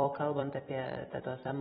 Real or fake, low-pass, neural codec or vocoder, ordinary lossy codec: fake; 19.8 kHz; vocoder, 44.1 kHz, 128 mel bands every 256 samples, BigVGAN v2; AAC, 16 kbps